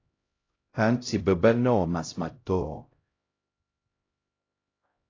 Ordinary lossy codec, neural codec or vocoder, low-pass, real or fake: AAC, 32 kbps; codec, 16 kHz, 0.5 kbps, X-Codec, HuBERT features, trained on LibriSpeech; 7.2 kHz; fake